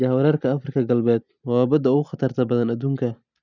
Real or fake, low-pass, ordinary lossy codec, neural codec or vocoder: real; 7.2 kHz; none; none